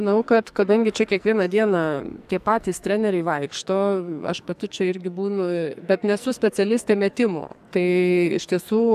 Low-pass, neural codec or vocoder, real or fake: 14.4 kHz; codec, 44.1 kHz, 2.6 kbps, SNAC; fake